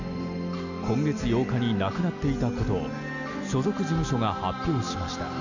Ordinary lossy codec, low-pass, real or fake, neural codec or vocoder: none; 7.2 kHz; real; none